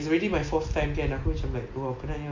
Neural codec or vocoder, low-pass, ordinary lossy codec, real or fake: none; 7.2 kHz; MP3, 48 kbps; real